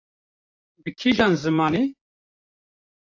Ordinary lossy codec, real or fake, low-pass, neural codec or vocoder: AAC, 48 kbps; fake; 7.2 kHz; vocoder, 44.1 kHz, 128 mel bands, Pupu-Vocoder